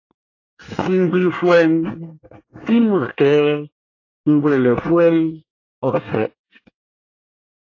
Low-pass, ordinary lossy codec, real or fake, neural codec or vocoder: 7.2 kHz; AAC, 32 kbps; fake; codec, 24 kHz, 1 kbps, SNAC